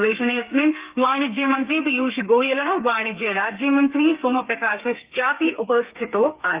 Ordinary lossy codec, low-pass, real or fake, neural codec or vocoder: Opus, 24 kbps; 3.6 kHz; fake; codec, 32 kHz, 1.9 kbps, SNAC